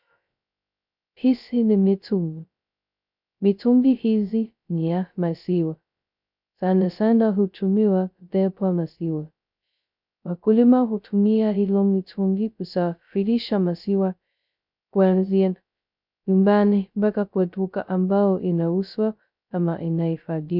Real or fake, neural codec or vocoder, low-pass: fake; codec, 16 kHz, 0.2 kbps, FocalCodec; 5.4 kHz